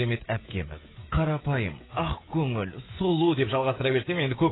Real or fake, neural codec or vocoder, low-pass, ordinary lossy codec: fake; codec, 16 kHz, 16 kbps, FreqCodec, smaller model; 7.2 kHz; AAC, 16 kbps